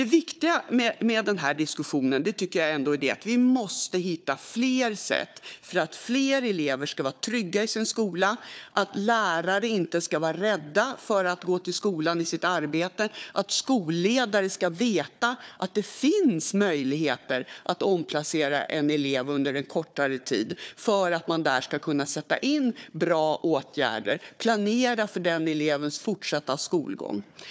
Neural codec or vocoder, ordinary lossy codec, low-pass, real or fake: codec, 16 kHz, 4 kbps, FunCodec, trained on Chinese and English, 50 frames a second; none; none; fake